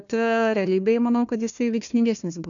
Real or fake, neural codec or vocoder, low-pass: fake; codec, 16 kHz, 1 kbps, FunCodec, trained on Chinese and English, 50 frames a second; 7.2 kHz